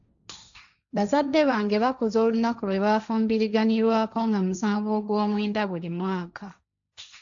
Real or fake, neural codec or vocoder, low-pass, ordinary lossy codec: fake; codec, 16 kHz, 1.1 kbps, Voila-Tokenizer; 7.2 kHz; AAC, 64 kbps